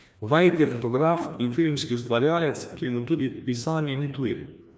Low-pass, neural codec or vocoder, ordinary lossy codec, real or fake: none; codec, 16 kHz, 1 kbps, FreqCodec, larger model; none; fake